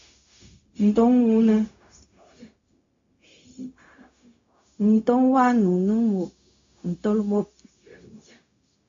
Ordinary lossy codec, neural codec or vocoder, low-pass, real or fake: AAC, 48 kbps; codec, 16 kHz, 0.4 kbps, LongCat-Audio-Codec; 7.2 kHz; fake